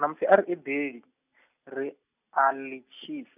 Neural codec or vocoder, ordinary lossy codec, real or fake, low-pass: none; none; real; 3.6 kHz